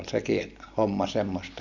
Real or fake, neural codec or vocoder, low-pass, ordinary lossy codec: real; none; 7.2 kHz; none